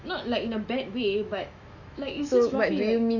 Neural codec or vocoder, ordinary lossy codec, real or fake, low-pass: autoencoder, 48 kHz, 128 numbers a frame, DAC-VAE, trained on Japanese speech; none; fake; 7.2 kHz